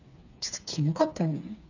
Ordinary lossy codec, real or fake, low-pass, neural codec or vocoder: none; fake; 7.2 kHz; codec, 16 kHz, 4 kbps, FreqCodec, smaller model